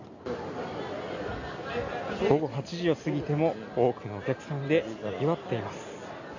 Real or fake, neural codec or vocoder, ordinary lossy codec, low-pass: real; none; AAC, 48 kbps; 7.2 kHz